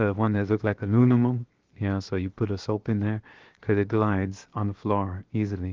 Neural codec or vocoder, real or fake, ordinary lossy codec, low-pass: codec, 16 kHz, 0.7 kbps, FocalCodec; fake; Opus, 16 kbps; 7.2 kHz